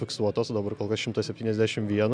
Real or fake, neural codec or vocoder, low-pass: real; none; 9.9 kHz